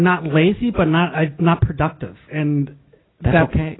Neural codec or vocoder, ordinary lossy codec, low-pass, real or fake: none; AAC, 16 kbps; 7.2 kHz; real